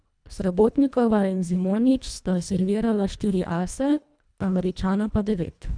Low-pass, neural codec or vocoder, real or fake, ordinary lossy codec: 9.9 kHz; codec, 24 kHz, 1.5 kbps, HILCodec; fake; none